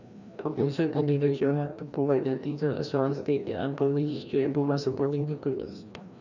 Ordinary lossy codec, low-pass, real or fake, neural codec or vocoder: none; 7.2 kHz; fake; codec, 16 kHz, 1 kbps, FreqCodec, larger model